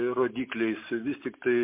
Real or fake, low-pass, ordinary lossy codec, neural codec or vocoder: real; 3.6 kHz; MP3, 24 kbps; none